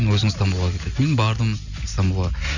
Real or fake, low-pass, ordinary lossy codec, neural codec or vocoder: real; 7.2 kHz; none; none